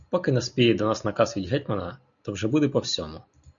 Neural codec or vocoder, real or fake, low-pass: none; real; 7.2 kHz